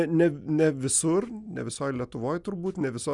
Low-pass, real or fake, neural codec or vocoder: 10.8 kHz; real; none